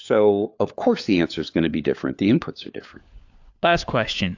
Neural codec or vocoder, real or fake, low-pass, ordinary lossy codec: codec, 16 kHz, 4 kbps, FunCodec, trained on LibriTTS, 50 frames a second; fake; 7.2 kHz; AAC, 48 kbps